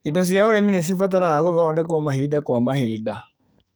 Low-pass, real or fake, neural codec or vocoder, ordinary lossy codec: none; fake; codec, 44.1 kHz, 2.6 kbps, SNAC; none